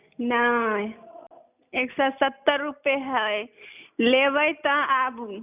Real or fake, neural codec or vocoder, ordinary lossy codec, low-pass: real; none; none; 3.6 kHz